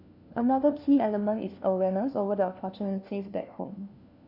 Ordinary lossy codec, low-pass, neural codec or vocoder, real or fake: none; 5.4 kHz; codec, 16 kHz, 1 kbps, FunCodec, trained on LibriTTS, 50 frames a second; fake